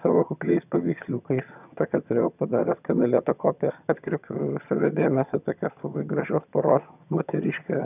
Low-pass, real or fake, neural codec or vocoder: 3.6 kHz; fake; vocoder, 22.05 kHz, 80 mel bands, HiFi-GAN